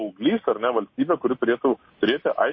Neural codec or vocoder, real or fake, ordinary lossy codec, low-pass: none; real; MP3, 24 kbps; 7.2 kHz